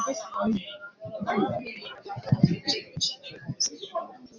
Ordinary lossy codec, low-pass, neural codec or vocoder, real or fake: MP3, 64 kbps; 7.2 kHz; vocoder, 44.1 kHz, 128 mel bands every 256 samples, BigVGAN v2; fake